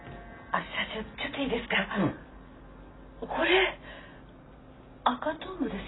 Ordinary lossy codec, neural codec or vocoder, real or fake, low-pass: AAC, 16 kbps; none; real; 7.2 kHz